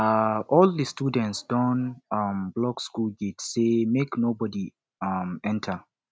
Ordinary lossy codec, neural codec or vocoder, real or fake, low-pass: none; none; real; none